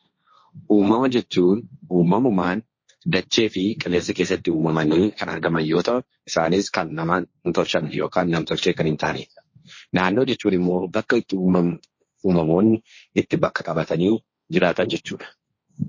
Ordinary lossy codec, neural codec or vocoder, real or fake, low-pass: MP3, 32 kbps; codec, 16 kHz, 1.1 kbps, Voila-Tokenizer; fake; 7.2 kHz